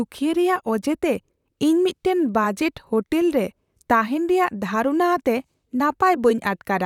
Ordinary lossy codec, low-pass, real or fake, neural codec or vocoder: none; 19.8 kHz; fake; vocoder, 44.1 kHz, 128 mel bands, Pupu-Vocoder